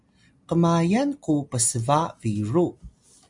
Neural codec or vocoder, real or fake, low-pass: none; real; 10.8 kHz